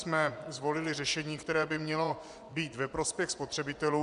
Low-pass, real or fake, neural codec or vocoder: 10.8 kHz; fake; vocoder, 24 kHz, 100 mel bands, Vocos